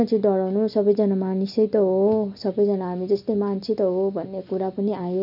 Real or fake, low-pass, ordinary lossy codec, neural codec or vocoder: real; 5.4 kHz; none; none